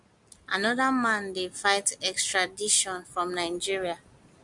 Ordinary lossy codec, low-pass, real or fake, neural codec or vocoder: MP3, 64 kbps; 10.8 kHz; real; none